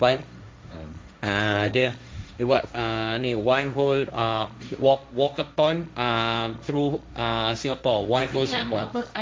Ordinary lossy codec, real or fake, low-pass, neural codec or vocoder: none; fake; none; codec, 16 kHz, 1.1 kbps, Voila-Tokenizer